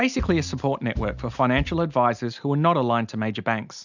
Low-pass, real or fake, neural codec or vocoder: 7.2 kHz; real; none